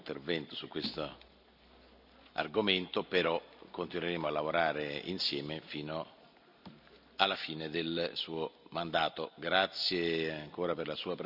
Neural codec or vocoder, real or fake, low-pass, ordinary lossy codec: none; real; 5.4 kHz; none